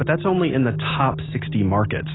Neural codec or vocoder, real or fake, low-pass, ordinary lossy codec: none; real; 7.2 kHz; AAC, 16 kbps